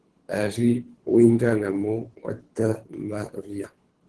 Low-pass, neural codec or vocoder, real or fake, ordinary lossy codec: 10.8 kHz; codec, 24 kHz, 3 kbps, HILCodec; fake; Opus, 16 kbps